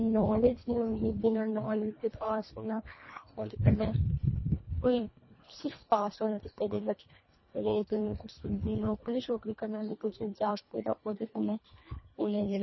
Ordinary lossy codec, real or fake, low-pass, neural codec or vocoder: MP3, 24 kbps; fake; 7.2 kHz; codec, 24 kHz, 1.5 kbps, HILCodec